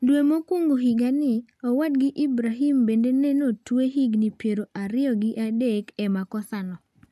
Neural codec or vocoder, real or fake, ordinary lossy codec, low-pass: none; real; MP3, 96 kbps; 14.4 kHz